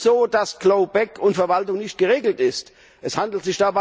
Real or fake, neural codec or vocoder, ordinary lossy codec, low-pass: real; none; none; none